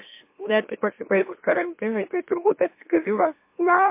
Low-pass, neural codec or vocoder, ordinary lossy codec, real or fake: 3.6 kHz; autoencoder, 44.1 kHz, a latent of 192 numbers a frame, MeloTTS; MP3, 24 kbps; fake